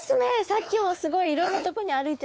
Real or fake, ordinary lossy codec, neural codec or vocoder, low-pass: fake; none; codec, 16 kHz, 4 kbps, X-Codec, WavLM features, trained on Multilingual LibriSpeech; none